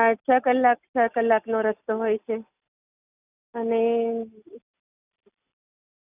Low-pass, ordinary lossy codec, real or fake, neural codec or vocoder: 3.6 kHz; none; real; none